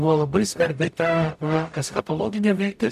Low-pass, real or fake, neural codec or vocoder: 14.4 kHz; fake; codec, 44.1 kHz, 0.9 kbps, DAC